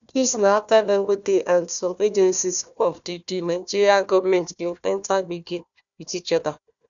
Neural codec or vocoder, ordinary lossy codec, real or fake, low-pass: codec, 16 kHz, 1 kbps, FunCodec, trained on Chinese and English, 50 frames a second; none; fake; 7.2 kHz